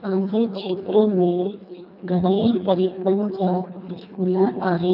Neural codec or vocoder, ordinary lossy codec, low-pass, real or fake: codec, 24 kHz, 1.5 kbps, HILCodec; none; 5.4 kHz; fake